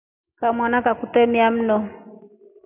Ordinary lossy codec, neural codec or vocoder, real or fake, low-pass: AAC, 32 kbps; none; real; 3.6 kHz